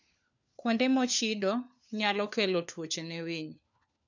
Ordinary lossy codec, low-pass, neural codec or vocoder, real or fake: none; 7.2 kHz; codec, 16 kHz, 4 kbps, FunCodec, trained on LibriTTS, 50 frames a second; fake